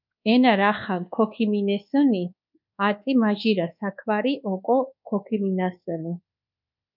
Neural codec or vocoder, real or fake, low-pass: codec, 24 kHz, 1.2 kbps, DualCodec; fake; 5.4 kHz